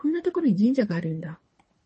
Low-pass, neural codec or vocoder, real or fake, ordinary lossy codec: 10.8 kHz; codec, 24 kHz, 3 kbps, HILCodec; fake; MP3, 32 kbps